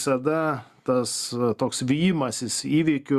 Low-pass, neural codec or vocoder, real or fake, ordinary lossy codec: 14.4 kHz; none; real; Opus, 64 kbps